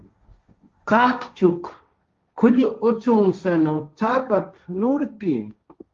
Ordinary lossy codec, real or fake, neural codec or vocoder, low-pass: Opus, 32 kbps; fake; codec, 16 kHz, 1.1 kbps, Voila-Tokenizer; 7.2 kHz